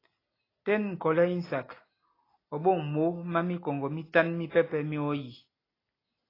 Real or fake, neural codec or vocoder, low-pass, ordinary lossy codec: real; none; 5.4 kHz; AAC, 24 kbps